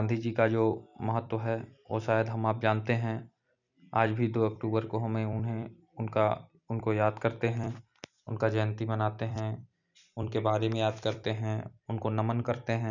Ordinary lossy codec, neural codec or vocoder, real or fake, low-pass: none; vocoder, 44.1 kHz, 128 mel bands every 256 samples, BigVGAN v2; fake; 7.2 kHz